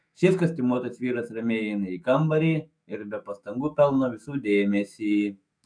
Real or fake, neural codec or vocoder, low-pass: fake; autoencoder, 48 kHz, 128 numbers a frame, DAC-VAE, trained on Japanese speech; 9.9 kHz